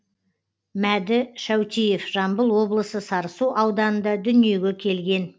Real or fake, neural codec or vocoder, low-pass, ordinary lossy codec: real; none; none; none